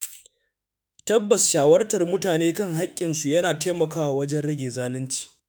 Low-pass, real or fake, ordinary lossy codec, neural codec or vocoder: none; fake; none; autoencoder, 48 kHz, 32 numbers a frame, DAC-VAE, trained on Japanese speech